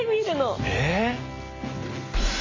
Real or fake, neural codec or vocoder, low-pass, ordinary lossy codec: real; none; 7.2 kHz; MP3, 48 kbps